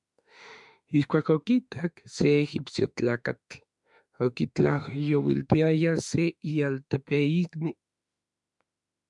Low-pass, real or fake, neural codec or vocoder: 10.8 kHz; fake; autoencoder, 48 kHz, 32 numbers a frame, DAC-VAE, trained on Japanese speech